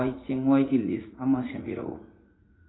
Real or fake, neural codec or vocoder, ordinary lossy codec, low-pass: fake; vocoder, 44.1 kHz, 80 mel bands, Vocos; AAC, 16 kbps; 7.2 kHz